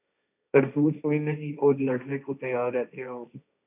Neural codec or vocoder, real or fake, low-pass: codec, 16 kHz, 1.1 kbps, Voila-Tokenizer; fake; 3.6 kHz